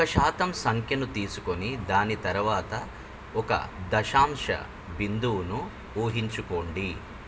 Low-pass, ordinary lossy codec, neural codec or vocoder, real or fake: none; none; none; real